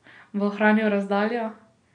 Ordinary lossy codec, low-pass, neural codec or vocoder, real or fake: none; 9.9 kHz; none; real